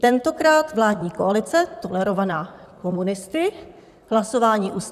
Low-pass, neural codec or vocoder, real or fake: 14.4 kHz; vocoder, 44.1 kHz, 128 mel bands, Pupu-Vocoder; fake